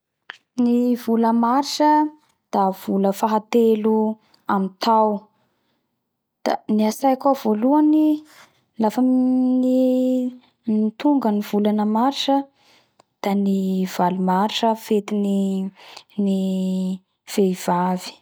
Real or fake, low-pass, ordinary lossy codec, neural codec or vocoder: real; none; none; none